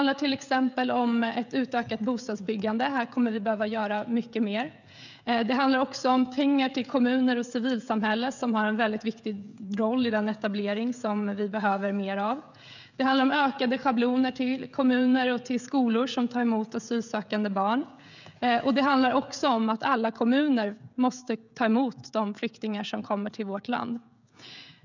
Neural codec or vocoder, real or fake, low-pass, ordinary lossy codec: codec, 16 kHz, 16 kbps, FreqCodec, smaller model; fake; 7.2 kHz; none